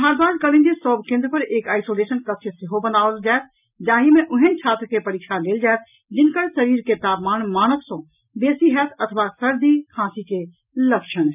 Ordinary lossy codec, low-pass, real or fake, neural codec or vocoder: none; 3.6 kHz; real; none